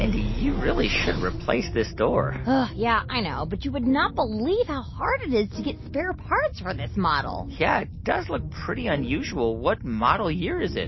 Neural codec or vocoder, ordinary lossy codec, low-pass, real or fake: none; MP3, 24 kbps; 7.2 kHz; real